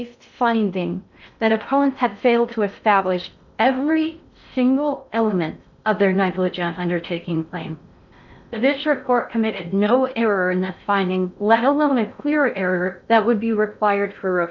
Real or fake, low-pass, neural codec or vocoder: fake; 7.2 kHz; codec, 16 kHz in and 24 kHz out, 0.6 kbps, FocalCodec, streaming, 2048 codes